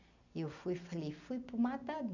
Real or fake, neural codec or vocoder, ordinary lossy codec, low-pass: real; none; none; 7.2 kHz